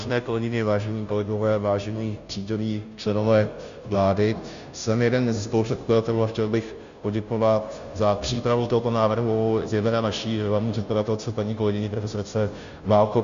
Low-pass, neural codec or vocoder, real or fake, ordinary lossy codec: 7.2 kHz; codec, 16 kHz, 0.5 kbps, FunCodec, trained on Chinese and English, 25 frames a second; fake; Opus, 64 kbps